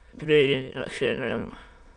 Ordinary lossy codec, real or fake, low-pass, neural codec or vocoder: none; fake; 9.9 kHz; autoencoder, 22.05 kHz, a latent of 192 numbers a frame, VITS, trained on many speakers